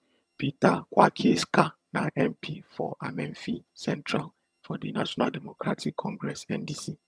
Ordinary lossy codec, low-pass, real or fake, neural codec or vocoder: none; none; fake; vocoder, 22.05 kHz, 80 mel bands, HiFi-GAN